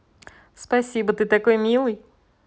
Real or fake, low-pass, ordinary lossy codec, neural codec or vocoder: real; none; none; none